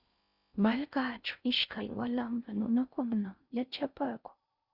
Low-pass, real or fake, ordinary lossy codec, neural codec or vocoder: 5.4 kHz; fake; Opus, 64 kbps; codec, 16 kHz in and 24 kHz out, 0.6 kbps, FocalCodec, streaming, 4096 codes